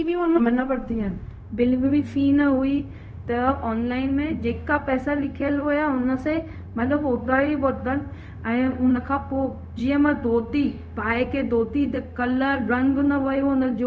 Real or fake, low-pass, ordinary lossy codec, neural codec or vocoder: fake; none; none; codec, 16 kHz, 0.4 kbps, LongCat-Audio-Codec